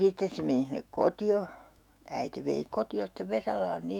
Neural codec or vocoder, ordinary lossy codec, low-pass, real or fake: autoencoder, 48 kHz, 128 numbers a frame, DAC-VAE, trained on Japanese speech; none; 19.8 kHz; fake